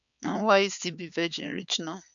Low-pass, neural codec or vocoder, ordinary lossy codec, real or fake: 7.2 kHz; codec, 16 kHz, 4 kbps, X-Codec, HuBERT features, trained on balanced general audio; none; fake